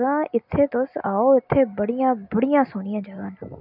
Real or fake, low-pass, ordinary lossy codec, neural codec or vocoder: real; 5.4 kHz; none; none